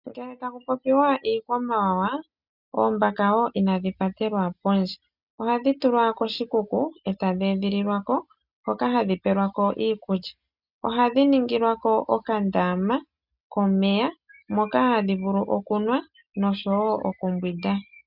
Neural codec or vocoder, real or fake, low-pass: none; real; 5.4 kHz